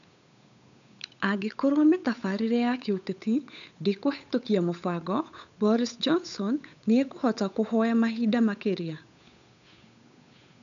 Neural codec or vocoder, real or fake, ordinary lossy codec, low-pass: codec, 16 kHz, 8 kbps, FunCodec, trained on Chinese and English, 25 frames a second; fake; MP3, 96 kbps; 7.2 kHz